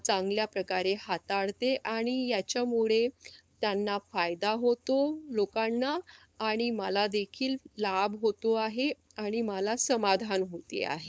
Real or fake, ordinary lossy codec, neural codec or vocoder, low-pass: fake; none; codec, 16 kHz, 4.8 kbps, FACodec; none